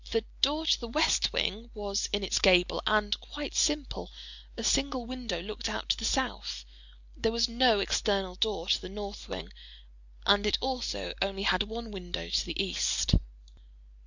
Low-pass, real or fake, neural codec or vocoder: 7.2 kHz; real; none